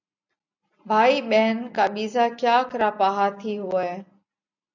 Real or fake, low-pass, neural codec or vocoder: real; 7.2 kHz; none